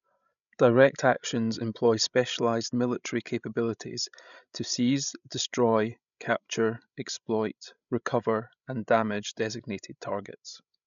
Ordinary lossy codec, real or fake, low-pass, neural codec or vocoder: none; fake; 7.2 kHz; codec, 16 kHz, 16 kbps, FreqCodec, larger model